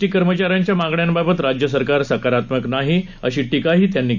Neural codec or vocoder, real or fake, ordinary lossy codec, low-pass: none; real; none; 7.2 kHz